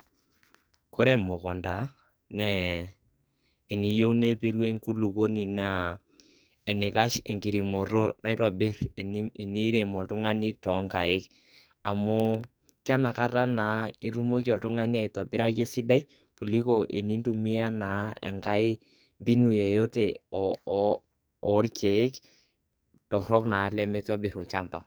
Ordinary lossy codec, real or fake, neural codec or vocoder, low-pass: none; fake; codec, 44.1 kHz, 2.6 kbps, SNAC; none